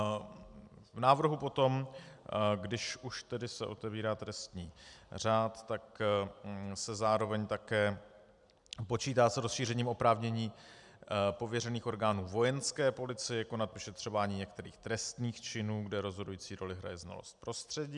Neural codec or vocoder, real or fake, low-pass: none; real; 10.8 kHz